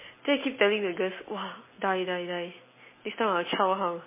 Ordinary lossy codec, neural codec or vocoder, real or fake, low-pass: MP3, 16 kbps; none; real; 3.6 kHz